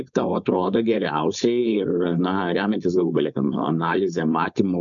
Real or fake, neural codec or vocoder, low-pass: fake; codec, 16 kHz, 4.8 kbps, FACodec; 7.2 kHz